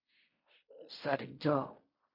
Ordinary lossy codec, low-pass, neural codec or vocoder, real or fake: MP3, 32 kbps; 5.4 kHz; codec, 16 kHz in and 24 kHz out, 0.4 kbps, LongCat-Audio-Codec, fine tuned four codebook decoder; fake